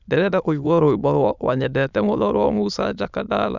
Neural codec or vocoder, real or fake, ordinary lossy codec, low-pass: autoencoder, 22.05 kHz, a latent of 192 numbers a frame, VITS, trained on many speakers; fake; none; 7.2 kHz